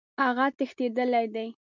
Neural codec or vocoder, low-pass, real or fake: none; 7.2 kHz; real